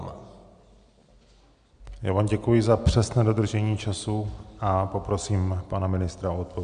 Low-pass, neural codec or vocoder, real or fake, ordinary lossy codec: 9.9 kHz; none; real; MP3, 96 kbps